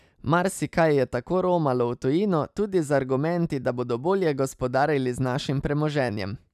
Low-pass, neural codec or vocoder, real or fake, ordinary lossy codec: 14.4 kHz; none; real; none